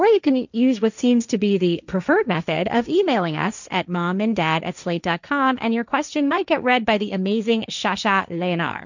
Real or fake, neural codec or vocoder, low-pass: fake; codec, 16 kHz, 1.1 kbps, Voila-Tokenizer; 7.2 kHz